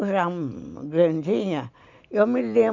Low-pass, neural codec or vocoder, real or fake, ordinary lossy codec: 7.2 kHz; none; real; none